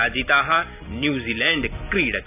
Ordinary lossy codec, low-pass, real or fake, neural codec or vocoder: none; 3.6 kHz; real; none